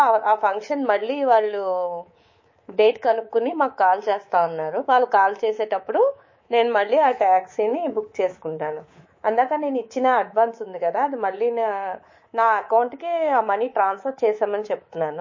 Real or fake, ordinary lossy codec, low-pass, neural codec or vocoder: fake; MP3, 32 kbps; 7.2 kHz; codec, 24 kHz, 3.1 kbps, DualCodec